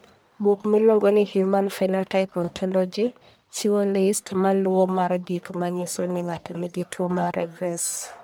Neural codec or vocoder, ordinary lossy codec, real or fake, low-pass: codec, 44.1 kHz, 1.7 kbps, Pupu-Codec; none; fake; none